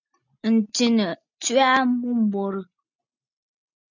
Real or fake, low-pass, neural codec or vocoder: real; 7.2 kHz; none